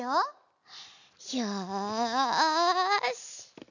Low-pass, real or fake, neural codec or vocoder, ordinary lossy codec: 7.2 kHz; real; none; none